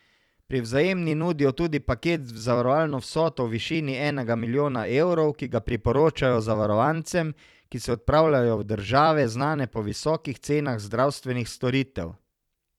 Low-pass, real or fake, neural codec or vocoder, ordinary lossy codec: 19.8 kHz; fake; vocoder, 44.1 kHz, 128 mel bands every 256 samples, BigVGAN v2; none